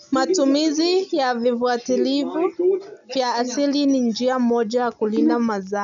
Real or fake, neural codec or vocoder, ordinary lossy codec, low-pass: real; none; none; 7.2 kHz